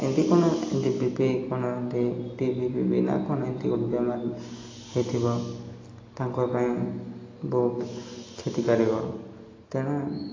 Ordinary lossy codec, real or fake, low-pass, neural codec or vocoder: AAC, 32 kbps; real; 7.2 kHz; none